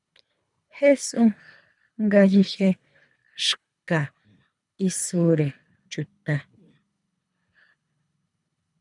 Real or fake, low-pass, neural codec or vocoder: fake; 10.8 kHz; codec, 24 kHz, 3 kbps, HILCodec